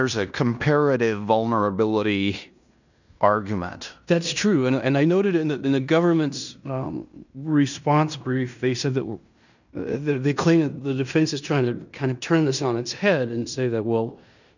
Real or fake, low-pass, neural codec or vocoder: fake; 7.2 kHz; codec, 16 kHz in and 24 kHz out, 0.9 kbps, LongCat-Audio-Codec, fine tuned four codebook decoder